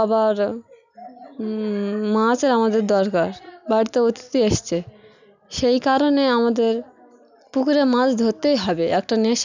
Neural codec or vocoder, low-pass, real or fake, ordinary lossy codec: none; 7.2 kHz; real; none